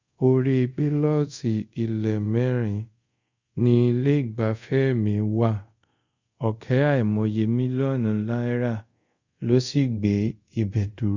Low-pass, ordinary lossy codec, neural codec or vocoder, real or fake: 7.2 kHz; Opus, 64 kbps; codec, 24 kHz, 0.5 kbps, DualCodec; fake